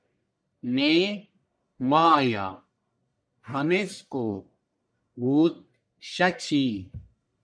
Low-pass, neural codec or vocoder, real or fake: 9.9 kHz; codec, 44.1 kHz, 1.7 kbps, Pupu-Codec; fake